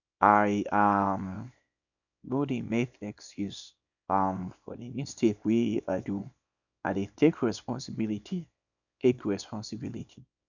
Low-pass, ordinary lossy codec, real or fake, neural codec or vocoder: 7.2 kHz; none; fake; codec, 24 kHz, 0.9 kbps, WavTokenizer, small release